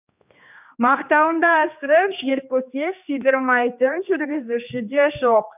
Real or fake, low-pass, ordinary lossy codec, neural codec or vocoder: fake; 3.6 kHz; none; codec, 16 kHz, 2 kbps, X-Codec, HuBERT features, trained on general audio